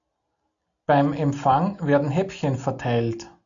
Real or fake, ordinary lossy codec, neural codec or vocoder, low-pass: real; MP3, 64 kbps; none; 7.2 kHz